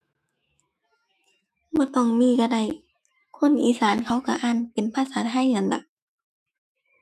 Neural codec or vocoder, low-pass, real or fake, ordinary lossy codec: autoencoder, 48 kHz, 128 numbers a frame, DAC-VAE, trained on Japanese speech; 14.4 kHz; fake; none